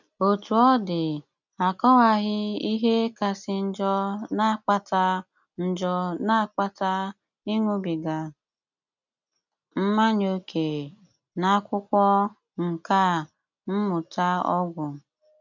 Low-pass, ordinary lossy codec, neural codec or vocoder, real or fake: 7.2 kHz; none; none; real